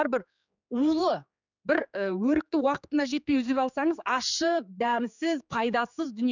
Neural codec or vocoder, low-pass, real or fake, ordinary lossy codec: codec, 16 kHz, 4 kbps, X-Codec, HuBERT features, trained on general audio; 7.2 kHz; fake; none